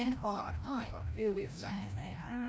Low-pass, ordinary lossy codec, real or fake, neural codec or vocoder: none; none; fake; codec, 16 kHz, 0.5 kbps, FreqCodec, larger model